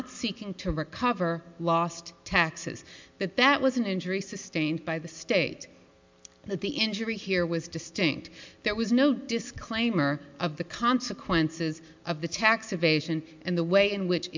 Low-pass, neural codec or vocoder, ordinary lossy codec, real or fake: 7.2 kHz; none; MP3, 64 kbps; real